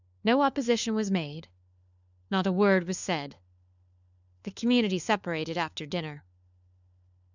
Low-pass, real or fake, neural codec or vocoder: 7.2 kHz; fake; codec, 16 kHz, 4 kbps, FunCodec, trained on LibriTTS, 50 frames a second